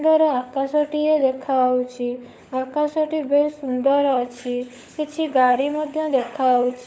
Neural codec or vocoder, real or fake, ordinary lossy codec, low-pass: codec, 16 kHz, 16 kbps, FunCodec, trained on LibriTTS, 50 frames a second; fake; none; none